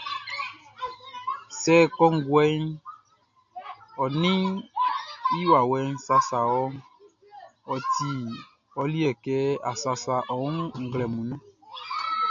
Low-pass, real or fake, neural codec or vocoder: 7.2 kHz; real; none